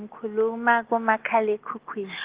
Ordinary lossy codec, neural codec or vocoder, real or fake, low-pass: Opus, 16 kbps; none; real; 3.6 kHz